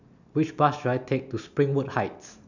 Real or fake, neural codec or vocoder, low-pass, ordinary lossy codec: real; none; 7.2 kHz; none